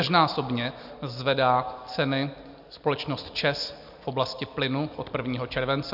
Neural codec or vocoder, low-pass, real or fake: none; 5.4 kHz; real